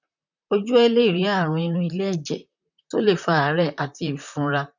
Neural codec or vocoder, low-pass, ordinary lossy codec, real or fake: vocoder, 44.1 kHz, 128 mel bands, Pupu-Vocoder; 7.2 kHz; none; fake